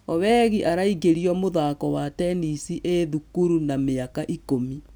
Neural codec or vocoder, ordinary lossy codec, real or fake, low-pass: none; none; real; none